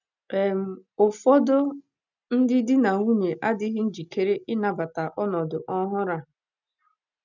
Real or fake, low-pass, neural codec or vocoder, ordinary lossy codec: real; 7.2 kHz; none; none